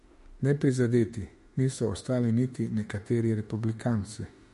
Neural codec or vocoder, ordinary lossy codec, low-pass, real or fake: autoencoder, 48 kHz, 32 numbers a frame, DAC-VAE, trained on Japanese speech; MP3, 48 kbps; 14.4 kHz; fake